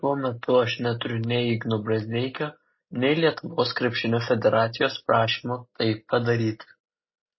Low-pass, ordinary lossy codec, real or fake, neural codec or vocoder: 7.2 kHz; MP3, 24 kbps; real; none